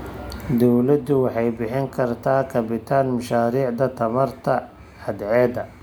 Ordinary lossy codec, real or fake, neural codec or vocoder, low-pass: none; real; none; none